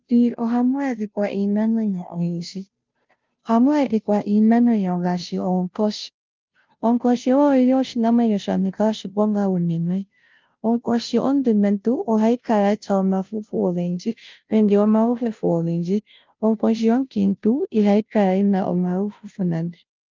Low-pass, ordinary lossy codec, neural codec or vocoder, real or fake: 7.2 kHz; Opus, 32 kbps; codec, 16 kHz, 0.5 kbps, FunCodec, trained on Chinese and English, 25 frames a second; fake